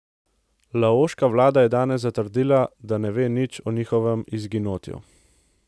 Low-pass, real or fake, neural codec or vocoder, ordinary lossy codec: none; real; none; none